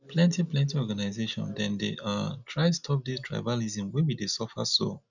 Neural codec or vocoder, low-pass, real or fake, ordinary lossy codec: none; 7.2 kHz; real; none